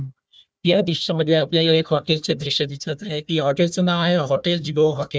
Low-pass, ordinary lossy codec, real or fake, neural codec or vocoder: none; none; fake; codec, 16 kHz, 1 kbps, FunCodec, trained on Chinese and English, 50 frames a second